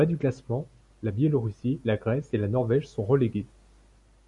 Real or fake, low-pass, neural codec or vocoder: real; 10.8 kHz; none